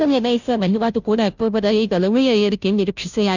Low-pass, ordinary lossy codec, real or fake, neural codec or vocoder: 7.2 kHz; none; fake; codec, 16 kHz, 0.5 kbps, FunCodec, trained on Chinese and English, 25 frames a second